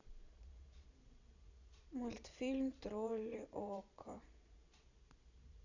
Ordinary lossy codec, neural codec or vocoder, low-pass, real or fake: none; vocoder, 22.05 kHz, 80 mel bands, Vocos; 7.2 kHz; fake